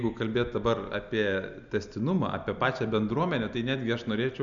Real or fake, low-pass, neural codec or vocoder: real; 7.2 kHz; none